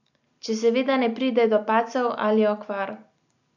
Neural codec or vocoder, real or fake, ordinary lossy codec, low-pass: none; real; none; 7.2 kHz